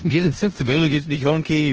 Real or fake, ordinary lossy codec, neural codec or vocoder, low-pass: fake; Opus, 16 kbps; codec, 16 kHz in and 24 kHz out, 0.4 kbps, LongCat-Audio-Codec, four codebook decoder; 7.2 kHz